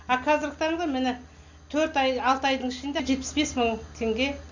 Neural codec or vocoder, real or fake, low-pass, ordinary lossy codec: none; real; 7.2 kHz; none